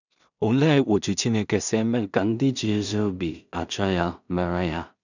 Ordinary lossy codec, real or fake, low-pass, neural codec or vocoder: none; fake; 7.2 kHz; codec, 16 kHz in and 24 kHz out, 0.4 kbps, LongCat-Audio-Codec, two codebook decoder